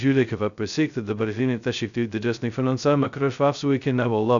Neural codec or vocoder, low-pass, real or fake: codec, 16 kHz, 0.2 kbps, FocalCodec; 7.2 kHz; fake